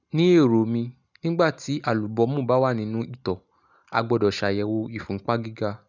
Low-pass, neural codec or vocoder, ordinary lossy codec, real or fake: 7.2 kHz; none; none; real